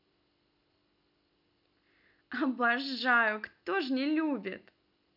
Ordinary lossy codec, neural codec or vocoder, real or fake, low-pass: none; none; real; 5.4 kHz